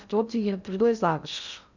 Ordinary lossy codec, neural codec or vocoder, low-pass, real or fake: none; codec, 16 kHz in and 24 kHz out, 0.6 kbps, FocalCodec, streaming, 2048 codes; 7.2 kHz; fake